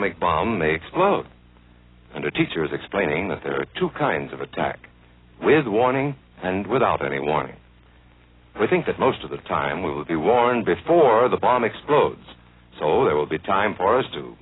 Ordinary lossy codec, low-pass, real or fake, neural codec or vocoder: AAC, 16 kbps; 7.2 kHz; real; none